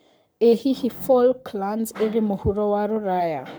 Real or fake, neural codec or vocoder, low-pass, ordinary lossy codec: fake; codec, 44.1 kHz, 7.8 kbps, Pupu-Codec; none; none